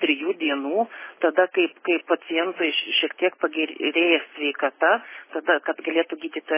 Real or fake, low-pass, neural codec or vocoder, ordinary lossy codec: real; 3.6 kHz; none; MP3, 16 kbps